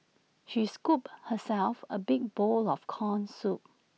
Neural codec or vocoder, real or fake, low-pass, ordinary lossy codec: none; real; none; none